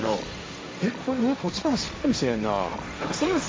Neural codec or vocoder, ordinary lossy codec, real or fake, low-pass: codec, 16 kHz, 1.1 kbps, Voila-Tokenizer; none; fake; none